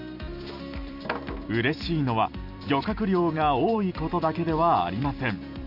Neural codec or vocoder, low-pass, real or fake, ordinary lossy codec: vocoder, 44.1 kHz, 128 mel bands every 256 samples, BigVGAN v2; 5.4 kHz; fake; none